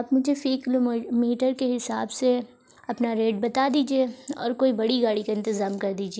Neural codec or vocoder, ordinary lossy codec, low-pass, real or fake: none; none; none; real